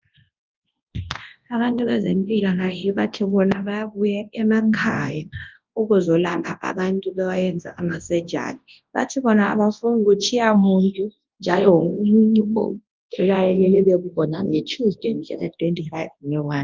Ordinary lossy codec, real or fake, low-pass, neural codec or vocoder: Opus, 32 kbps; fake; 7.2 kHz; codec, 24 kHz, 0.9 kbps, WavTokenizer, large speech release